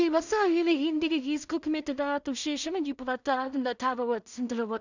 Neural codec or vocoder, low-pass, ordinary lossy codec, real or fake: codec, 16 kHz in and 24 kHz out, 0.4 kbps, LongCat-Audio-Codec, two codebook decoder; 7.2 kHz; none; fake